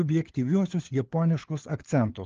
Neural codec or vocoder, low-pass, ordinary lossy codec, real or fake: codec, 16 kHz, 4 kbps, FunCodec, trained on LibriTTS, 50 frames a second; 7.2 kHz; Opus, 16 kbps; fake